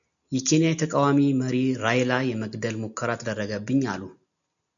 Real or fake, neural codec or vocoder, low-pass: real; none; 7.2 kHz